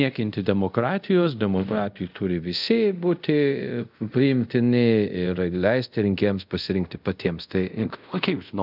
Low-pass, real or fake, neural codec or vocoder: 5.4 kHz; fake; codec, 24 kHz, 0.5 kbps, DualCodec